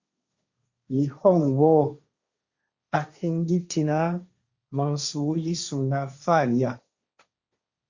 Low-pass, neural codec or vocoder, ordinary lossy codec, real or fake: 7.2 kHz; codec, 16 kHz, 1.1 kbps, Voila-Tokenizer; Opus, 64 kbps; fake